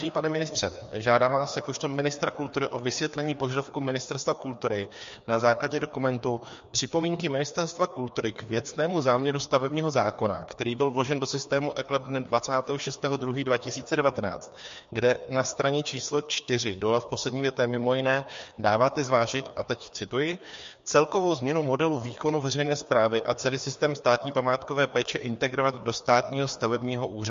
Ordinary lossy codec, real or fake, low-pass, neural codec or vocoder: MP3, 48 kbps; fake; 7.2 kHz; codec, 16 kHz, 2 kbps, FreqCodec, larger model